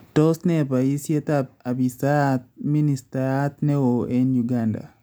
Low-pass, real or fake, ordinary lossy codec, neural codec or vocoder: none; real; none; none